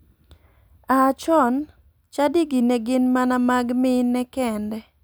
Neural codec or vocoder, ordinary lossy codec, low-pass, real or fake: none; none; none; real